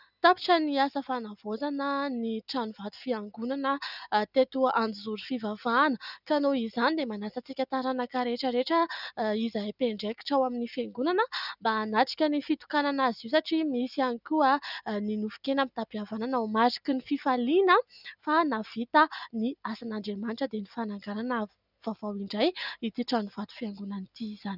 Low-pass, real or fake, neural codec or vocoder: 5.4 kHz; real; none